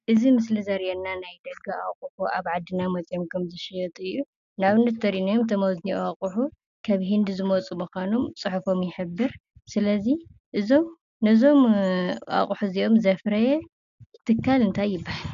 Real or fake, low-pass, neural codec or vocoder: real; 7.2 kHz; none